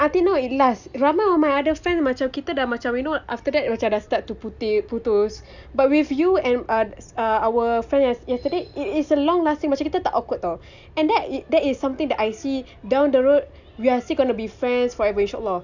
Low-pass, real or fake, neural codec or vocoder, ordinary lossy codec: 7.2 kHz; real; none; none